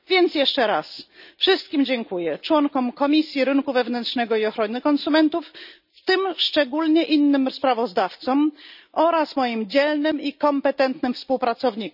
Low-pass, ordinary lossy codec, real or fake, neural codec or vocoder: 5.4 kHz; none; real; none